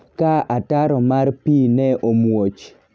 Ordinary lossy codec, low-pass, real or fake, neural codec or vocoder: none; none; real; none